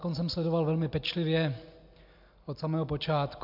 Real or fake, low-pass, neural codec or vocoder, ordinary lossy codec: real; 5.4 kHz; none; MP3, 48 kbps